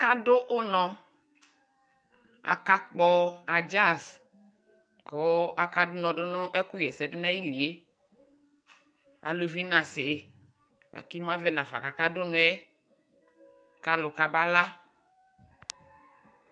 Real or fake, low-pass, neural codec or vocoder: fake; 9.9 kHz; codec, 44.1 kHz, 2.6 kbps, SNAC